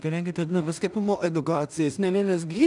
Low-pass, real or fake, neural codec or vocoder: 10.8 kHz; fake; codec, 16 kHz in and 24 kHz out, 0.4 kbps, LongCat-Audio-Codec, two codebook decoder